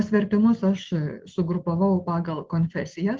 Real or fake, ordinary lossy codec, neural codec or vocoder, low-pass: real; Opus, 16 kbps; none; 7.2 kHz